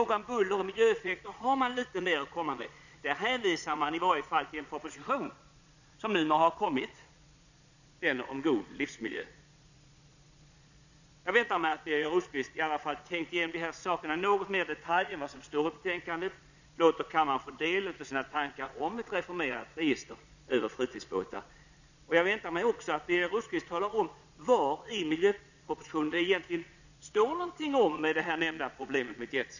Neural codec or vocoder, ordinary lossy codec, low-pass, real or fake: vocoder, 22.05 kHz, 80 mel bands, Vocos; none; 7.2 kHz; fake